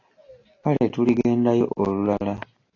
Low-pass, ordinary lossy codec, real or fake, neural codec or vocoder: 7.2 kHz; AAC, 32 kbps; real; none